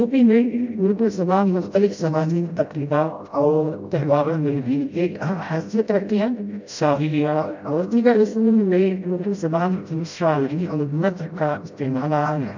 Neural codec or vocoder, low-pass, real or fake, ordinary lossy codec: codec, 16 kHz, 0.5 kbps, FreqCodec, smaller model; 7.2 kHz; fake; MP3, 64 kbps